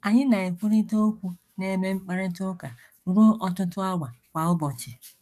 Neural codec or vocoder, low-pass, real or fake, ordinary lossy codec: codec, 44.1 kHz, 7.8 kbps, DAC; 14.4 kHz; fake; none